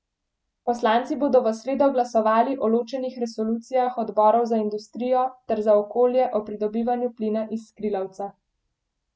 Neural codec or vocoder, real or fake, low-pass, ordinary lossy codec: none; real; none; none